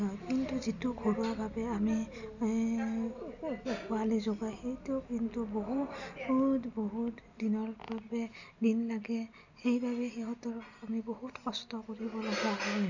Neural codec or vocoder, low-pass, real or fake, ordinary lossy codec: none; 7.2 kHz; real; none